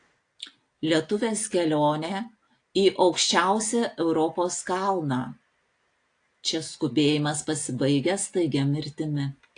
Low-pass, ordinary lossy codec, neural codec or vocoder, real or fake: 9.9 kHz; AAC, 48 kbps; none; real